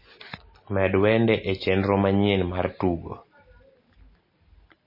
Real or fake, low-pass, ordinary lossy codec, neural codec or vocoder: real; 5.4 kHz; MP3, 24 kbps; none